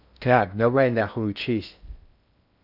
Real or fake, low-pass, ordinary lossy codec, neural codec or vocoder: fake; 5.4 kHz; none; codec, 16 kHz in and 24 kHz out, 0.6 kbps, FocalCodec, streaming, 2048 codes